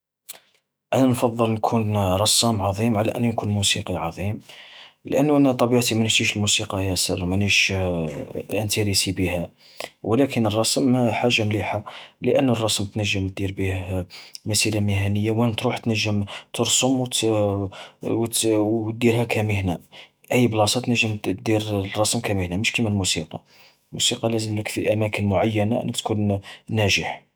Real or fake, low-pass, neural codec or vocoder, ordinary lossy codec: fake; none; autoencoder, 48 kHz, 128 numbers a frame, DAC-VAE, trained on Japanese speech; none